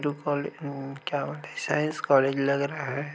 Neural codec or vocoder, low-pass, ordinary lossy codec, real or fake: none; none; none; real